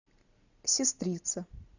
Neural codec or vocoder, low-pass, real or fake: none; 7.2 kHz; real